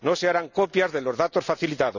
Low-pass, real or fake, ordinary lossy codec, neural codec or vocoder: 7.2 kHz; real; none; none